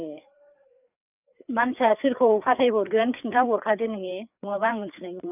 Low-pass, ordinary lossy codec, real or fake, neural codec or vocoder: 3.6 kHz; none; fake; codec, 16 kHz, 4 kbps, FreqCodec, larger model